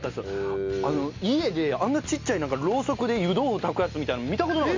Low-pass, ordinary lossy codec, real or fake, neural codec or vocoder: 7.2 kHz; MP3, 64 kbps; real; none